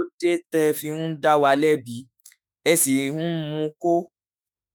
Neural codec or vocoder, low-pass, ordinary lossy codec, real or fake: autoencoder, 48 kHz, 32 numbers a frame, DAC-VAE, trained on Japanese speech; none; none; fake